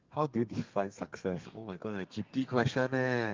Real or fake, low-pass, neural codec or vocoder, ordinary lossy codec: fake; 7.2 kHz; codec, 32 kHz, 1.9 kbps, SNAC; Opus, 32 kbps